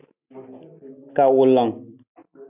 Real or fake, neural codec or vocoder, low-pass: real; none; 3.6 kHz